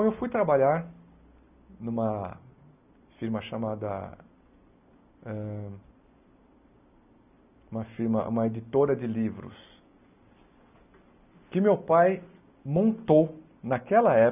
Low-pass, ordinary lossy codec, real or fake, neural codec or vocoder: 3.6 kHz; none; real; none